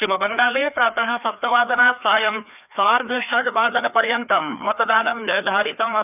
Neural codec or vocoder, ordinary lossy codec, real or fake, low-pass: codec, 16 kHz, 2 kbps, FreqCodec, larger model; none; fake; 3.6 kHz